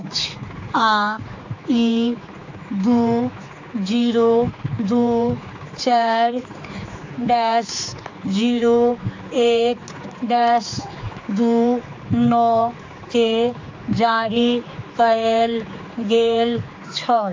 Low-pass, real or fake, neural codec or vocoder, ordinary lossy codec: 7.2 kHz; fake; codec, 16 kHz, 4 kbps, X-Codec, HuBERT features, trained on general audio; AAC, 48 kbps